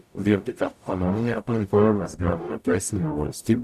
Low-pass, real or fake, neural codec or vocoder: 14.4 kHz; fake; codec, 44.1 kHz, 0.9 kbps, DAC